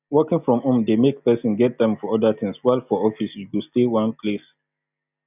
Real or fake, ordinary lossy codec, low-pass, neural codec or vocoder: real; none; 3.6 kHz; none